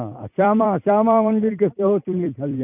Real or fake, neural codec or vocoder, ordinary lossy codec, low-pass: fake; vocoder, 44.1 kHz, 128 mel bands every 256 samples, BigVGAN v2; none; 3.6 kHz